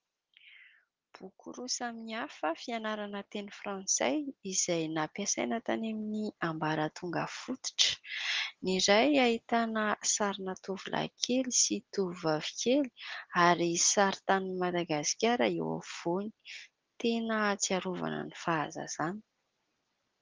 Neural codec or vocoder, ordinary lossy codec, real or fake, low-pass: none; Opus, 32 kbps; real; 7.2 kHz